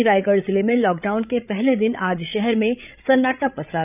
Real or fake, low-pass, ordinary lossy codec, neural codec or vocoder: fake; 3.6 kHz; none; codec, 16 kHz, 8 kbps, FreqCodec, larger model